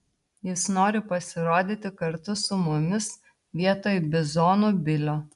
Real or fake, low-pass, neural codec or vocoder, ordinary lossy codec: real; 10.8 kHz; none; MP3, 96 kbps